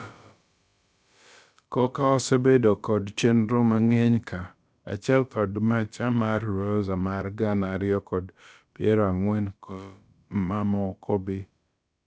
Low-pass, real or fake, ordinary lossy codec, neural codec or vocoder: none; fake; none; codec, 16 kHz, about 1 kbps, DyCAST, with the encoder's durations